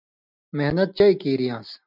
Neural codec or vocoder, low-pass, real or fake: none; 5.4 kHz; real